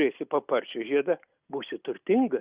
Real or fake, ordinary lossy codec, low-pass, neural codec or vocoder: real; Opus, 64 kbps; 3.6 kHz; none